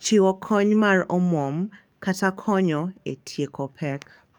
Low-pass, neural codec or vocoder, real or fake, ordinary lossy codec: 19.8 kHz; codec, 44.1 kHz, 7.8 kbps, DAC; fake; none